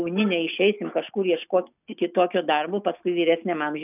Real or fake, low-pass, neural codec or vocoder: real; 3.6 kHz; none